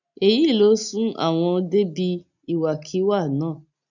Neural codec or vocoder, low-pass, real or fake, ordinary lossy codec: none; 7.2 kHz; real; none